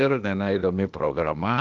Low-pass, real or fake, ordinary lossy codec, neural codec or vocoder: 7.2 kHz; fake; Opus, 24 kbps; codec, 16 kHz, 0.8 kbps, ZipCodec